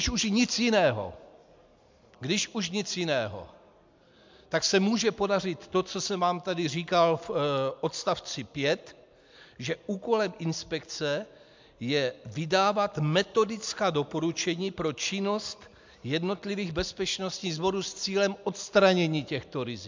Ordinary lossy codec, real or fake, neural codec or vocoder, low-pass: MP3, 64 kbps; real; none; 7.2 kHz